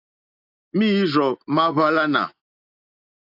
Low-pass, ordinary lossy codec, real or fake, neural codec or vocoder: 5.4 kHz; AAC, 32 kbps; real; none